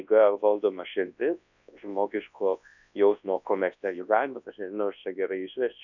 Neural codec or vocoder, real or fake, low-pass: codec, 24 kHz, 0.9 kbps, WavTokenizer, large speech release; fake; 7.2 kHz